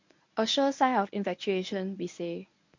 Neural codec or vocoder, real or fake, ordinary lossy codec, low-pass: codec, 24 kHz, 0.9 kbps, WavTokenizer, medium speech release version 1; fake; MP3, 48 kbps; 7.2 kHz